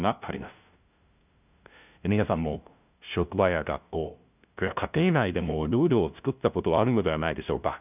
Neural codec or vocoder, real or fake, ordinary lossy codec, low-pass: codec, 16 kHz, 0.5 kbps, FunCodec, trained on LibriTTS, 25 frames a second; fake; none; 3.6 kHz